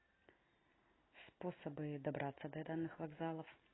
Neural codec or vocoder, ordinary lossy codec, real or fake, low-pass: none; AAC, 16 kbps; real; 7.2 kHz